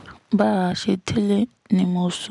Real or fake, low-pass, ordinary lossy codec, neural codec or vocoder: real; 10.8 kHz; none; none